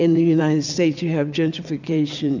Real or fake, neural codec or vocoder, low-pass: fake; vocoder, 22.05 kHz, 80 mel bands, WaveNeXt; 7.2 kHz